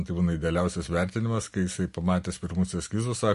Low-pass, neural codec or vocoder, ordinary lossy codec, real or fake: 14.4 kHz; none; MP3, 48 kbps; real